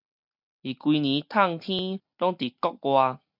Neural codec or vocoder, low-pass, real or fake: none; 5.4 kHz; real